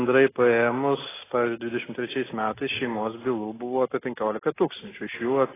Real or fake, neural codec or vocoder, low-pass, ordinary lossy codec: real; none; 3.6 kHz; AAC, 16 kbps